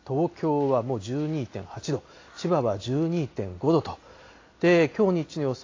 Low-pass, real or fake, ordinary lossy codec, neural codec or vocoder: 7.2 kHz; real; AAC, 32 kbps; none